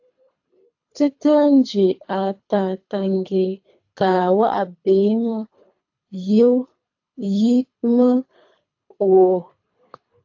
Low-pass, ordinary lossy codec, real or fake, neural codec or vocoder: 7.2 kHz; AAC, 48 kbps; fake; codec, 24 kHz, 3 kbps, HILCodec